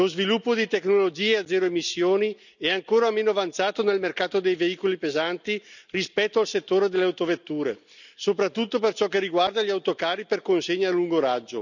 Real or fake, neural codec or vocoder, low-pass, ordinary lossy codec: real; none; 7.2 kHz; none